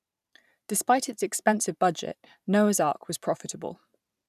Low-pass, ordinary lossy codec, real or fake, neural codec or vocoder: 14.4 kHz; none; fake; vocoder, 44.1 kHz, 128 mel bands every 512 samples, BigVGAN v2